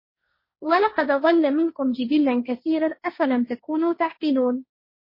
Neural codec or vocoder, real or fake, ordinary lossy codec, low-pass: codec, 16 kHz, 1.1 kbps, Voila-Tokenizer; fake; MP3, 24 kbps; 5.4 kHz